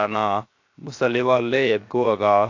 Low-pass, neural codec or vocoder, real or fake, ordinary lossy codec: 7.2 kHz; codec, 16 kHz, 0.7 kbps, FocalCodec; fake; AAC, 48 kbps